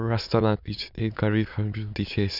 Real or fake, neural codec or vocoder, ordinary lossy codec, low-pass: fake; autoencoder, 22.05 kHz, a latent of 192 numbers a frame, VITS, trained on many speakers; none; 5.4 kHz